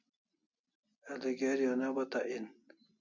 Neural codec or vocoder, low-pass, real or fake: none; 7.2 kHz; real